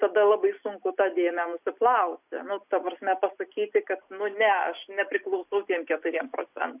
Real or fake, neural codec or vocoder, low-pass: real; none; 3.6 kHz